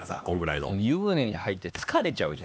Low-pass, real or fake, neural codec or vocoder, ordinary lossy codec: none; fake; codec, 16 kHz, 2 kbps, X-Codec, HuBERT features, trained on LibriSpeech; none